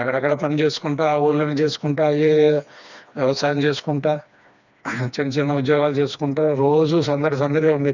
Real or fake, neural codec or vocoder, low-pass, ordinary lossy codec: fake; codec, 16 kHz, 2 kbps, FreqCodec, smaller model; 7.2 kHz; none